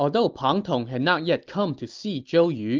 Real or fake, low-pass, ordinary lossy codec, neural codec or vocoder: real; 7.2 kHz; Opus, 32 kbps; none